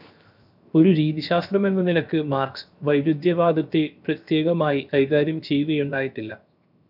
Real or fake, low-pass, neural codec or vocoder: fake; 5.4 kHz; codec, 16 kHz, 0.7 kbps, FocalCodec